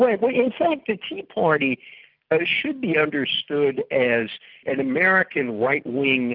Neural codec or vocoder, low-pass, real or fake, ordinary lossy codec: none; 5.4 kHz; real; Opus, 24 kbps